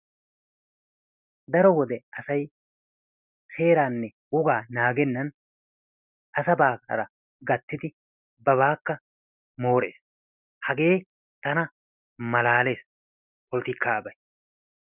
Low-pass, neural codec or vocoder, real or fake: 3.6 kHz; none; real